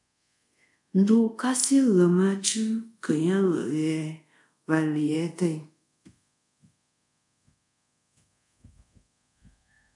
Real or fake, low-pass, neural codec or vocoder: fake; 10.8 kHz; codec, 24 kHz, 0.5 kbps, DualCodec